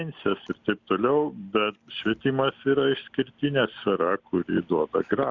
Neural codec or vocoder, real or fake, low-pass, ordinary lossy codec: none; real; 7.2 kHz; Opus, 64 kbps